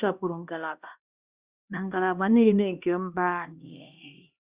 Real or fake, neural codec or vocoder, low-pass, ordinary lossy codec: fake; codec, 16 kHz, 1 kbps, X-Codec, HuBERT features, trained on LibriSpeech; 3.6 kHz; Opus, 64 kbps